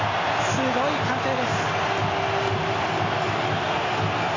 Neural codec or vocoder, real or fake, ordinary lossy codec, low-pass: none; real; AAC, 32 kbps; 7.2 kHz